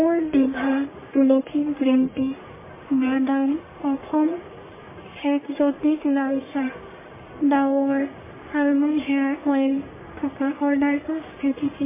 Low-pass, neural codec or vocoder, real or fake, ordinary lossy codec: 3.6 kHz; codec, 44.1 kHz, 1.7 kbps, Pupu-Codec; fake; MP3, 16 kbps